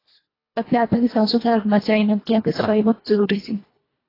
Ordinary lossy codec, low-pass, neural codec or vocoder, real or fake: AAC, 24 kbps; 5.4 kHz; codec, 24 kHz, 1.5 kbps, HILCodec; fake